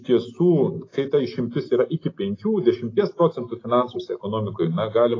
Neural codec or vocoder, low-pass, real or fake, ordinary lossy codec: none; 7.2 kHz; real; AAC, 32 kbps